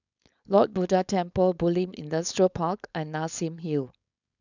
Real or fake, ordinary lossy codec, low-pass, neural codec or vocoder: fake; none; 7.2 kHz; codec, 16 kHz, 4.8 kbps, FACodec